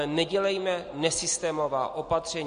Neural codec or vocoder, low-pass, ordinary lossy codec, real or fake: none; 9.9 kHz; MP3, 48 kbps; real